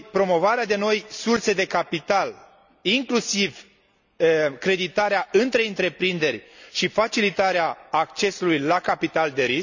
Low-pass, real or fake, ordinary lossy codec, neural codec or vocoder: 7.2 kHz; real; none; none